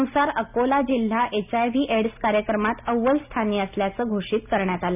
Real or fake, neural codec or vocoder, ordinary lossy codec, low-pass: real; none; none; 3.6 kHz